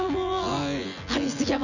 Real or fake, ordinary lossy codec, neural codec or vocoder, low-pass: fake; none; vocoder, 24 kHz, 100 mel bands, Vocos; 7.2 kHz